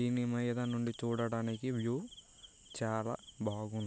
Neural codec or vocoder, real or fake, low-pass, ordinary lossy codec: none; real; none; none